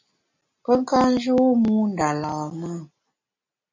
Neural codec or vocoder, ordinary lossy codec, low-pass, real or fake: none; MP3, 48 kbps; 7.2 kHz; real